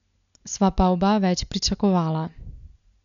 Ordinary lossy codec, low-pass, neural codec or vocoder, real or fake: none; 7.2 kHz; none; real